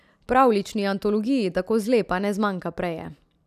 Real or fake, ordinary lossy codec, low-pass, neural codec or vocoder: real; none; 14.4 kHz; none